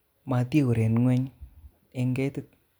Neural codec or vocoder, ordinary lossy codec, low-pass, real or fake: none; none; none; real